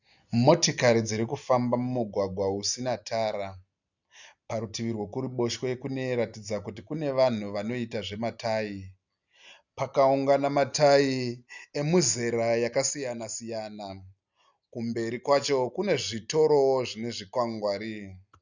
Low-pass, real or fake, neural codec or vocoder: 7.2 kHz; real; none